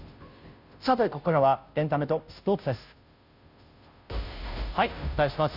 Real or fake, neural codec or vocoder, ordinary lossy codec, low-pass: fake; codec, 16 kHz, 0.5 kbps, FunCodec, trained on Chinese and English, 25 frames a second; none; 5.4 kHz